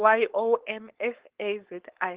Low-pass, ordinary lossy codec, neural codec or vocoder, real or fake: 3.6 kHz; Opus, 16 kbps; codec, 16 kHz, 4.8 kbps, FACodec; fake